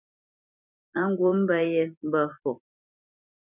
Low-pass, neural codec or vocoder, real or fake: 3.6 kHz; vocoder, 44.1 kHz, 128 mel bands every 256 samples, BigVGAN v2; fake